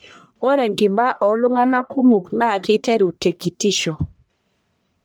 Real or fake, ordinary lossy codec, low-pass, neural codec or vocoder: fake; none; none; codec, 44.1 kHz, 1.7 kbps, Pupu-Codec